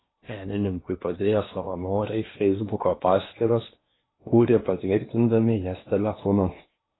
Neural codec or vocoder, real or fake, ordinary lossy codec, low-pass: codec, 16 kHz in and 24 kHz out, 0.8 kbps, FocalCodec, streaming, 65536 codes; fake; AAC, 16 kbps; 7.2 kHz